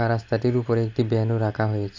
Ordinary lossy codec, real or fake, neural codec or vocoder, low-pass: AAC, 48 kbps; real; none; 7.2 kHz